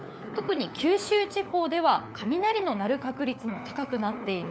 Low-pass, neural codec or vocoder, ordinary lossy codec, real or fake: none; codec, 16 kHz, 4 kbps, FunCodec, trained on LibriTTS, 50 frames a second; none; fake